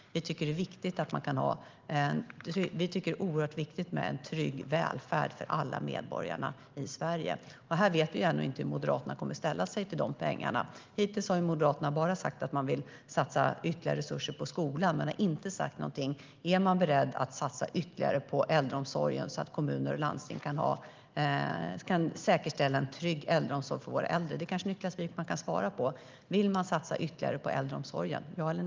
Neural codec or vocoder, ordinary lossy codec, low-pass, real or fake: none; Opus, 32 kbps; 7.2 kHz; real